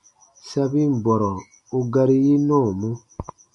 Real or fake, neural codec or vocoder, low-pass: real; none; 10.8 kHz